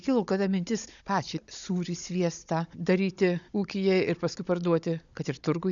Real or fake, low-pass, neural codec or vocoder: fake; 7.2 kHz; codec, 16 kHz, 16 kbps, FunCodec, trained on Chinese and English, 50 frames a second